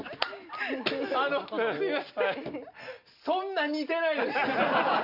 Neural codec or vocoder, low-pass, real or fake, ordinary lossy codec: none; 5.4 kHz; real; Opus, 64 kbps